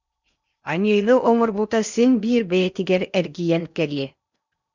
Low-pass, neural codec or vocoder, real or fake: 7.2 kHz; codec, 16 kHz in and 24 kHz out, 0.8 kbps, FocalCodec, streaming, 65536 codes; fake